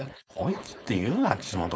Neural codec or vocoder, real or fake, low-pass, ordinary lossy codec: codec, 16 kHz, 4.8 kbps, FACodec; fake; none; none